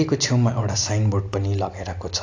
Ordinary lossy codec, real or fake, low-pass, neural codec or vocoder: AAC, 48 kbps; real; 7.2 kHz; none